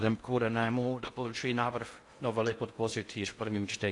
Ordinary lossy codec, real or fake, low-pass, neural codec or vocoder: AAC, 48 kbps; fake; 10.8 kHz; codec, 16 kHz in and 24 kHz out, 0.6 kbps, FocalCodec, streaming, 2048 codes